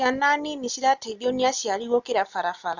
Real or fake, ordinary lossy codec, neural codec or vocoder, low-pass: real; none; none; 7.2 kHz